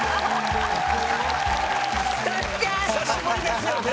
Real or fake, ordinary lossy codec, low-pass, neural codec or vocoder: real; none; none; none